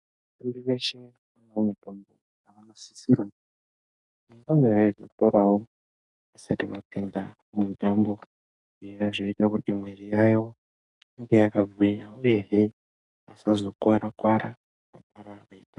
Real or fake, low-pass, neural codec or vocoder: fake; 10.8 kHz; codec, 44.1 kHz, 2.6 kbps, SNAC